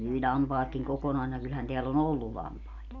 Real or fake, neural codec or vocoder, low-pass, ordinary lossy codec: real; none; 7.2 kHz; none